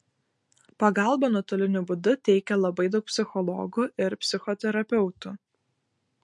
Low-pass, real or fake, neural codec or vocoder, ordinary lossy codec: 10.8 kHz; real; none; MP3, 48 kbps